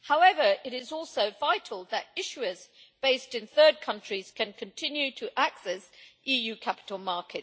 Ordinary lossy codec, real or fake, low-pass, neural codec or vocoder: none; real; none; none